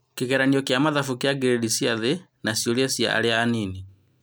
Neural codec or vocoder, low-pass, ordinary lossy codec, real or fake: none; none; none; real